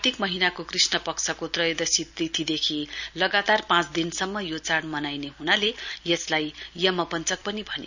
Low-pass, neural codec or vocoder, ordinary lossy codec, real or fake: 7.2 kHz; none; none; real